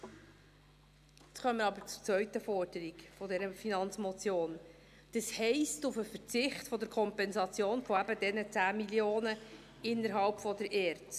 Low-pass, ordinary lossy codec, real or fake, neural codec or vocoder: 14.4 kHz; none; real; none